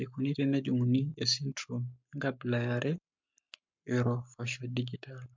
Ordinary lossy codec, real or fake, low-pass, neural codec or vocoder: MP3, 48 kbps; fake; 7.2 kHz; codec, 44.1 kHz, 7.8 kbps, Pupu-Codec